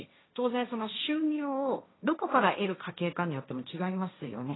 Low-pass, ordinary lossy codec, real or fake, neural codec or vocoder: 7.2 kHz; AAC, 16 kbps; fake; codec, 16 kHz, 1.1 kbps, Voila-Tokenizer